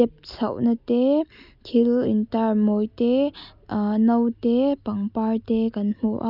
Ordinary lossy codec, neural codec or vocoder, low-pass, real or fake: none; none; 5.4 kHz; real